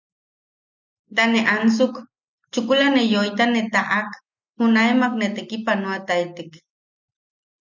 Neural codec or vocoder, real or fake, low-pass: none; real; 7.2 kHz